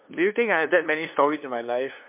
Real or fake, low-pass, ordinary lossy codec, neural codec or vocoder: fake; 3.6 kHz; MP3, 32 kbps; codec, 16 kHz, 2 kbps, FunCodec, trained on LibriTTS, 25 frames a second